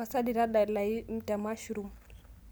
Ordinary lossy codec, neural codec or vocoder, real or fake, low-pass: none; none; real; none